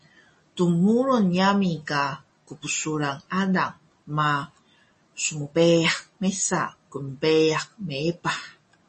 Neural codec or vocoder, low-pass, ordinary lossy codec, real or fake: none; 10.8 kHz; MP3, 32 kbps; real